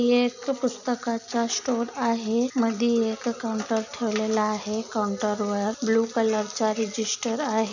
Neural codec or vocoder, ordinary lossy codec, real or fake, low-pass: none; none; real; 7.2 kHz